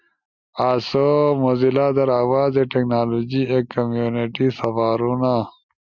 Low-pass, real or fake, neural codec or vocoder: 7.2 kHz; real; none